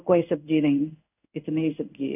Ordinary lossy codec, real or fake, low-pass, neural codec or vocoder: none; fake; 3.6 kHz; codec, 16 kHz in and 24 kHz out, 1 kbps, XY-Tokenizer